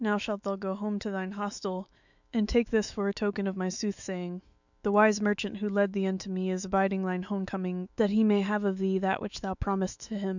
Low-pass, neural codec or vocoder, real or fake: 7.2 kHz; autoencoder, 48 kHz, 128 numbers a frame, DAC-VAE, trained on Japanese speech; fake